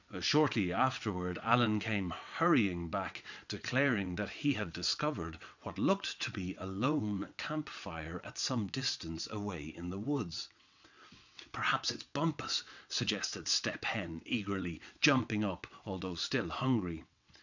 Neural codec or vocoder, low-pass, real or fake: vocoder, 22.05 kHz, 80 mel bands, WaveNeXt; 7.2 kHz; fake